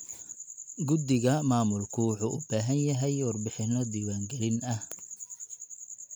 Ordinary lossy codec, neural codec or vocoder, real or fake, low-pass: none; none; real; none